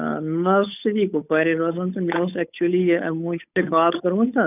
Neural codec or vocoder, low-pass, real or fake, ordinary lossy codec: codec, 16 kHz, 8 kbps, FunCodec, trained on Chinese and English, 25 frames a second; 3.6 kHz; fake; none